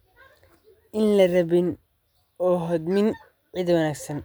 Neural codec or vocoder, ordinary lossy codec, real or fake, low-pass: none; none; real; none